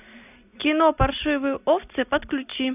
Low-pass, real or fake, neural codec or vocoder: 3.6 kHz; real; none